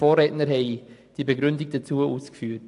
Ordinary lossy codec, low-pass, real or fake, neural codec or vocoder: AAC, 64 kbps; 10.8 kHz; real; none